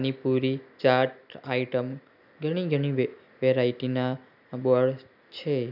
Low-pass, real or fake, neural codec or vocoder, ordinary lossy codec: 5.4 kHz; real; none; none